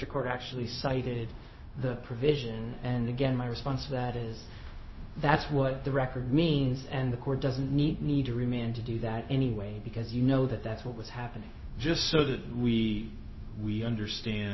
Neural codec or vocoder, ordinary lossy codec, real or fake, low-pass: codec, 16 kHz, 0.4 kbps, LongCat-Audio-Codec; MP3, 24 kbps; fake; 7.2 kHz